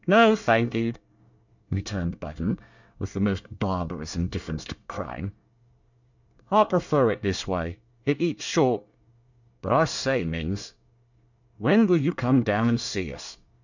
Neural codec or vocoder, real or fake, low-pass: codec, 24 kHz, 1 kbps, SNAC; fake; 7.2 kHz